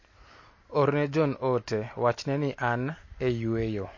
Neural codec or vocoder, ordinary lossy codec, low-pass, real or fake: none; MP3, 32 kbps; 7.2 kHz; real